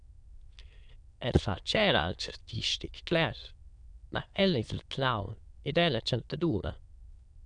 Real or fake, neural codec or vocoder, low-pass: fake; autoencoder, 22.05 kHz, a latent of 192 numbers a frame, VITS, trained on many speakers; 9.9 kHz